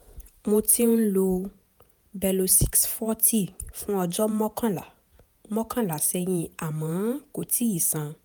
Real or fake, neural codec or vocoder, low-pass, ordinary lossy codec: fake; vocoder, 48 kHz, 128 mel bands, Vocos; none; none